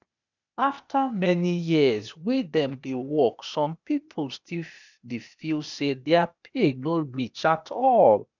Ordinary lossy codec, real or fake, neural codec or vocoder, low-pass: none; fake; codec, 16 kHz, 0.8 kbps, ZipCodec; 7.2 kHz